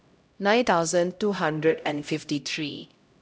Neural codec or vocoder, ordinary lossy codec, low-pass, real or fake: codec, 16 kHz, 0.5 kbps, X-Codec, HuBERT features, trained on LibriSpeech; none; none; fake